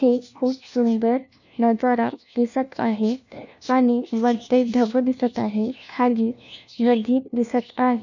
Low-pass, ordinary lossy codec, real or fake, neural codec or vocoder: 7.2 kHz; none; fake; codec, 16 kHz, 1 kbps, FunCodec, trained on LibriTTS, 50 frames a second